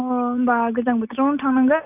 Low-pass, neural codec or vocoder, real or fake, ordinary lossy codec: 3.6 kHz; none; real; none